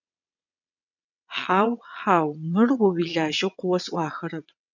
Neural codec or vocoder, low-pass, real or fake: vocoder, 22.05 kHz, 80 mel bands, WaveNeXt; 7.2 kHz; fake